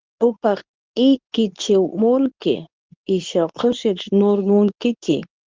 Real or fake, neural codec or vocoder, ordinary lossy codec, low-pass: fake; codec, 24 kHz, 0.9 kbps, WavTokenizer, medium speech release version 2; Opus, 24 kbps; 7.2 kHz